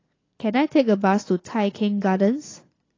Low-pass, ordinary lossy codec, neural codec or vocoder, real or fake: 7.2 kHz; AAC, 32 kbps; vocoder, 44.1 kHz, 80 mel bands, Vocos; fake